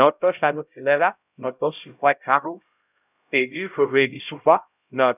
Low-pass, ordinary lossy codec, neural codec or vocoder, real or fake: 3.6 kHz; none; codec, 16 kHz, 0.5 kbps, X-Codec, HuBERT features, trained on LibriSpeech; fake